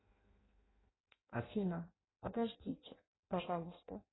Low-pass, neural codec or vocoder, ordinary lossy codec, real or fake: 7.2 kHz; codec, 16 kHz in and 24 kHz out, 0.6 kbps, FireRedTTS-2 codec; AAC, 16 kbps; fake